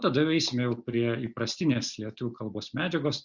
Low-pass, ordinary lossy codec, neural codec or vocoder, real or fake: 7.2 kHz; Opus, 64 kbps; none; real